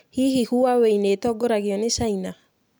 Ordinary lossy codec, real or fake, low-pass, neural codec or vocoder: none; real; none; none